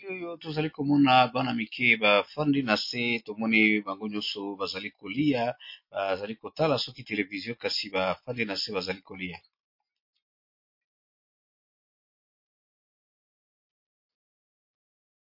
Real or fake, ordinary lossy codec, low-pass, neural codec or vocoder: real; MP3, 32 kbps; 5.4 kHz; none